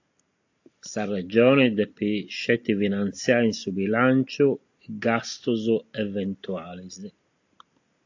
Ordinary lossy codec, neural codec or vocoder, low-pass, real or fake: AAC, 48 kbps; none; 7.2 kHz; real